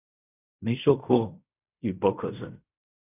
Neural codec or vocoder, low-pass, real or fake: codec, 16 kHz in and 24 kHz out, 0.4 kbps, LongCat-Audio-Codec, fine tuned four codebook decoder; 3.6 kHz; fake